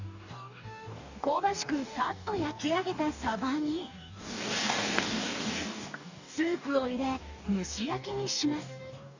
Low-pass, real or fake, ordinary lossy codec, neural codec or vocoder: 7.2 kHz; fake; none; codec, 44.1 kHz, 2.6 kbps, DAC